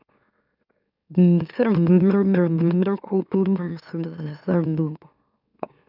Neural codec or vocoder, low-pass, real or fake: autoencoder, 44.1 kHz, a latent of 192 numbers a frame, MeloTTS; 5.4 kHz; fake